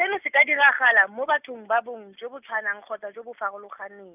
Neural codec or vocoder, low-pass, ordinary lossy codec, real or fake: none; 3.6 kHz; none; real